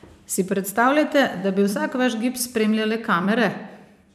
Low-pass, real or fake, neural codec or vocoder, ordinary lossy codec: 14.4 kHz; fake; vocoder, 44.1 kHz, 128 mel bands every 256 samples, BigVGAN v2; none